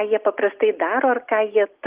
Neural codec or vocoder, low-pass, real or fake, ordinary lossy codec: none; 3.6 kHz; real; Opus, 32 kbps